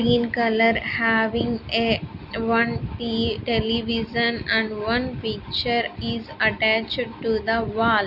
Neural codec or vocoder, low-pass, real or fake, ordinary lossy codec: none; 5.4 kHz; real; none